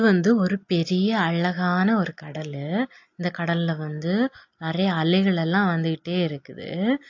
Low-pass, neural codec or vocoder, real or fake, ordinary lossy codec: 7.2 kHz; none; real; AAC, 48 kbps